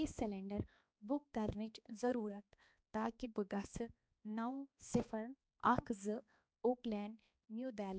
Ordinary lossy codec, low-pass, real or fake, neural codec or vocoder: none; none; fake; codec, 16 kHz, 2 kbps, X-Codec, HuBERT features, trained on balanced general audio